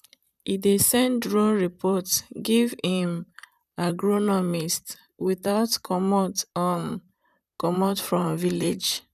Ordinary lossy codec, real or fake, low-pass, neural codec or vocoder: none; fake; 14.4 kHz; vocoder, 44.1 kHz, 128 mel bands, Pupu-Vocoder